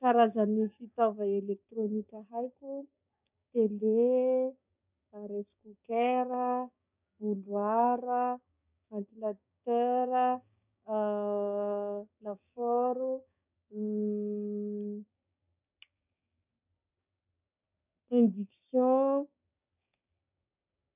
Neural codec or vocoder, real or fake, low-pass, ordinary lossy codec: codec, 16 kHz, 6 kbps, DAC; fake; 3.6 kHz; none